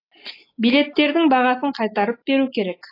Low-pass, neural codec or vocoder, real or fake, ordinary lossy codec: 5.4 kHz; none; real; AAC, 24 kbps